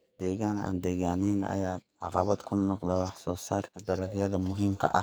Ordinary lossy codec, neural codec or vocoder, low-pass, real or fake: none; codec, 44.1 kHz, 2.6 kbps, SNAC; none; fake